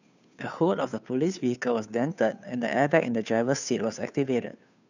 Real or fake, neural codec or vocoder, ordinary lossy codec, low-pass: fake; codec, 16 kHz, 2 kbps, FunCodec, trained on Chinese and English, 25 frames a second; none; 7.2 kHz